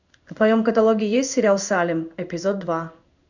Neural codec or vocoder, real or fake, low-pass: codec, 16 kHz in and 24 kHz out, 1 kbps, XY-Tokenizer; fake; 7.2 kHz